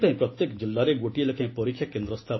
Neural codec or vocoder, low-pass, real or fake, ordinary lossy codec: none; 7.2 kHz; real; MP3, 24 kbps